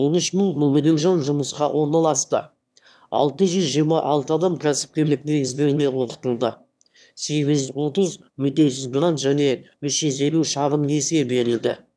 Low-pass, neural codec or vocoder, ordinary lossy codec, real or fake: none; autoencoder, 22.05 kHz, a latent of 192 numbers a frame, VITS, trained on one speaker; none; fake